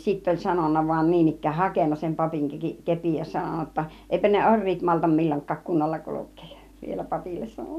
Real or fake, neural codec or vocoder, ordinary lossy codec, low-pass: real; none; none; 14.4 kHz